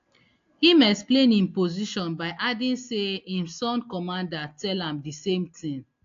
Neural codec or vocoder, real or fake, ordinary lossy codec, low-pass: none; real; AAC, 48 kbps; 7.2 kHz